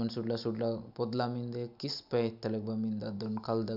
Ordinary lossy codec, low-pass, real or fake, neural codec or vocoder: none; 5.4 kHz; real; none